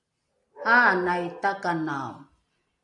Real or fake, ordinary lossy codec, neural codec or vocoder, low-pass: real; AAC, 64 kbps; none; 10.8 kHz